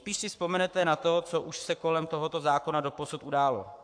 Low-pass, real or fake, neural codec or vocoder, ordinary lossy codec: 9.9 kHz; fake; codec, 44.1 kHz, 7.8 kbps, Pupu-Codec; AAC, 64 kbps